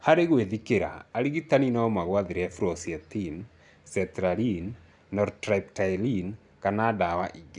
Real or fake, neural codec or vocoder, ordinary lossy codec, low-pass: real; none; none; 10.8 kHz